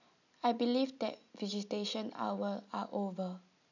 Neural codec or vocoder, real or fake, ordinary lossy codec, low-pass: none; real; none; 7.2 kHz